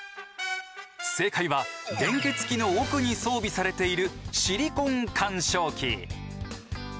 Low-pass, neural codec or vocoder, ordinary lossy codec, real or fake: none; none; none; real